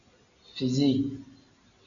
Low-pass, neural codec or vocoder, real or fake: 7.2 kHz; none; real